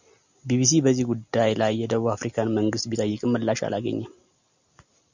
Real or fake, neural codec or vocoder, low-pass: real; none; 7.2 kHz